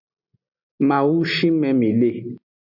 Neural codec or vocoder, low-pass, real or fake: none; 5.4 kHz; real